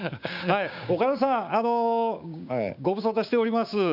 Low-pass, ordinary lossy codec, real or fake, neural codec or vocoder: 5.4 kHz; none; fake; codec, 16 kHz, 6 kbps, DAC